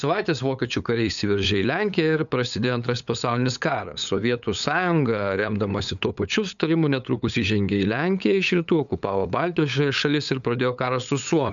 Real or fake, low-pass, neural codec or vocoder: fake; 7.2 kHz; codec, 16 kHz, 8 kbps, FunCodec, trained on LibriTTS, 25 frames a second